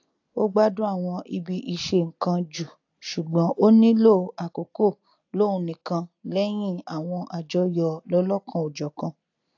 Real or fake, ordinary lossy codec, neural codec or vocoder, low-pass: real; AAC, 48 kbps; none; 7.2 kHz